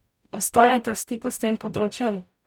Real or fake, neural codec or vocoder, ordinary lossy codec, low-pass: fake; codec, 44.1 kHz, 0.9 kbps, DAC; none; 19.8 kHz